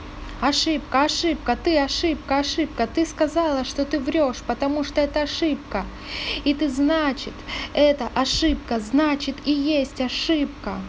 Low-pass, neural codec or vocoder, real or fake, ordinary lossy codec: none; none; real; none